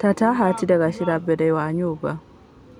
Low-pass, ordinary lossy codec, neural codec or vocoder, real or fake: 19.8 kHz; none; none; real